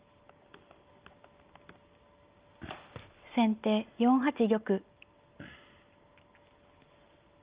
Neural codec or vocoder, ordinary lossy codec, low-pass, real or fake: none; Opus, 32 kbps; 3.6 kHz; real